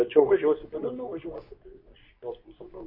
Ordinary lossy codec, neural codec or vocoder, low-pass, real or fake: MP3, 48 kbps; codec, 24 kHz, 0.9 kbps, WavTokenizer, medium speech release version 2; 5.4 kHz; fake